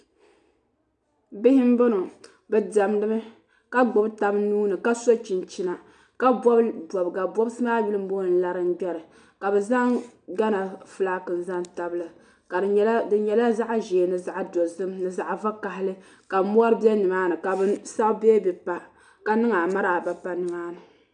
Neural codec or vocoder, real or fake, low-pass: none; real; 9.9 kHz